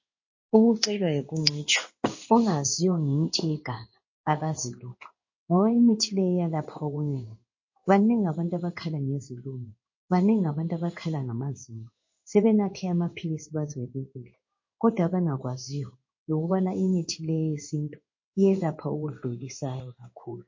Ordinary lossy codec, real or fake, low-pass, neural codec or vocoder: MP3, 32 kbps; fake; 7.2 kHz; codec, 16 kHz in and 24 kHz out, 1 kbps, XY-Tokenizer